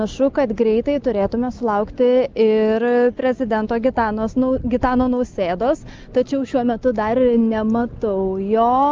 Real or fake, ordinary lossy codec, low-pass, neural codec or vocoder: real; Opus, 24 kbps; 7.2 kHz; none